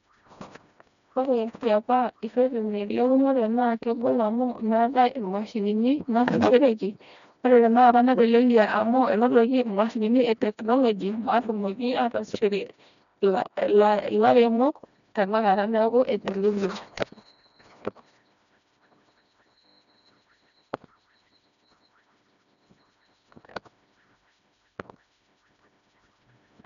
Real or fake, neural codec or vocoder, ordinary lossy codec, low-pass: fake; codec, 16 kHz, 1 kbps, FreqCodec, smaller model; none; 7.2 kHz